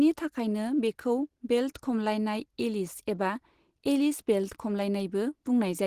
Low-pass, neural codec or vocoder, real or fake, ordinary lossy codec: 14.4 kHz; none; real; Opus, 16 kbps